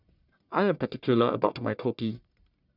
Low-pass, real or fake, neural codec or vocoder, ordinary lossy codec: 5.4 kHz; fake; codec, 44.1 kHz, 1.7 kbps, Pupu-Codec; none